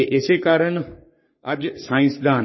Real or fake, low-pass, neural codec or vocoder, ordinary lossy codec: fake; 7.2 kHz; codec, 44.1 kHz, 3.4 kbps, Pupu-Codec; MP3, 24 kbps